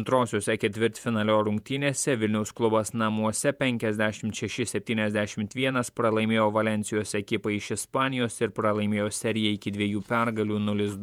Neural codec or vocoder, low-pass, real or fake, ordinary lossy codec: vocoder, 48 kHz, 128 mel bands, Vocos; 19.8 kHz; fake; MP3, 96 kbps